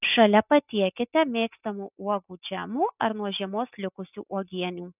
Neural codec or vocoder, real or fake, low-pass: none; real; 3.6 kHz